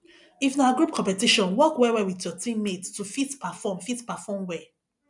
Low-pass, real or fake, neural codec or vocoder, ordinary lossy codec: 10.8 kHz; real; none; none